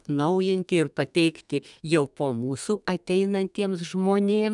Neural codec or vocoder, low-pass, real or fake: codec, 32 kHz, 1.9 kbps, SNAC; 10.8 kHz; fake